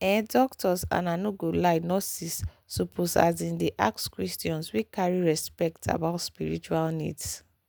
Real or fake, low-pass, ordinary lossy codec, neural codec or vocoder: real; none; none; none